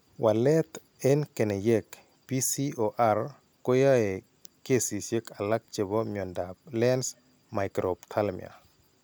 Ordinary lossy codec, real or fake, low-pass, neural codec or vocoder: none; real; none; none